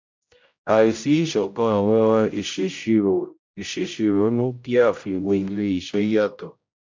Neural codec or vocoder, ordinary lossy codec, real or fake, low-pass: codec, 16 kHz, 0.5 kbps, X-Codec, HuBERT features, trained on general audio; MP3, 64 kbps; fake; 7.2 kHz